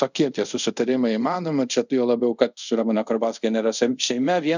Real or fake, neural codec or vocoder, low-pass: fake; codec, 24 kHz, 0.5 kbps, DualCodec; 7.2 kHz